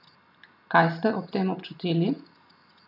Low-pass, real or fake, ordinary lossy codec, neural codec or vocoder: 5.4 kHz; real; none; none